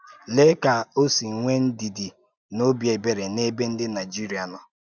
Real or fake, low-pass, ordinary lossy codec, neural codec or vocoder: real; none; none; none